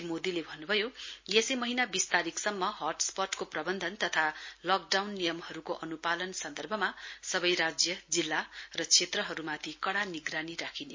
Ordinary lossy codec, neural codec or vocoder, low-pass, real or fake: MP3, 32 kbps; none; 7.2 kHz; real